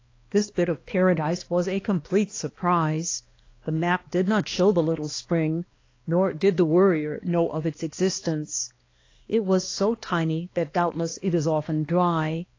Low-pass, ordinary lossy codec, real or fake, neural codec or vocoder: 7.2 kHz; AAC, 32 kbps; fake; codec, 16 kHz, 2 kbps, X-Codec, HuBERT features, trained on balanced general audio